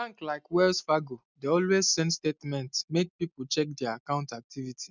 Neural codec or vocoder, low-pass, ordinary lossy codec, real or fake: none; 7.2 kHz; none; real